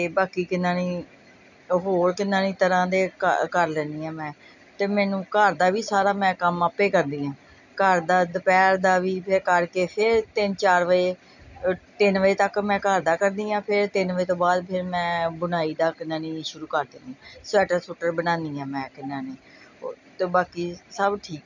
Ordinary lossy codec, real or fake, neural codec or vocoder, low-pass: none; real; none; 7.2 kHz